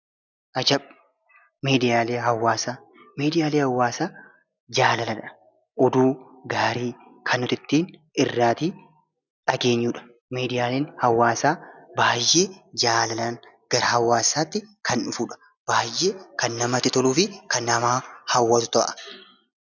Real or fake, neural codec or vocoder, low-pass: real; none; 7.2 kHz